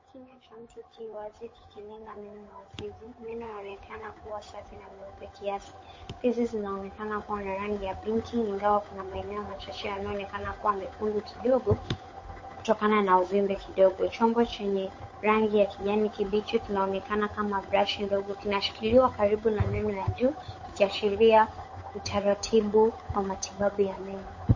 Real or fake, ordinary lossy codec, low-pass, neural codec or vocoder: fake; MP3, 32 kbps; 7.2 kHz; codec, 16 kHz, 8 kbps, FunCodec, trained on Chinese and English, 25 frames a second